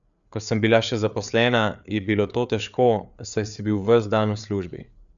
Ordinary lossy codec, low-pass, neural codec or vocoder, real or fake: none; 7.2 kHz; codec, 16 kHz, 4 kbps, FreqCodec, larger model; fake